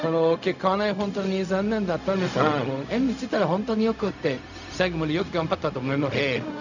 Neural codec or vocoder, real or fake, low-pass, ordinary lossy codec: codec, 16 kHz, 0.4 kbps, LongCat-Audio-Codec; fake; 7.2 kHz; none